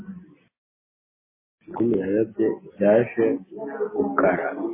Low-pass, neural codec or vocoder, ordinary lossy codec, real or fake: 3.6 kHz; none; MP3, 16 kbps; real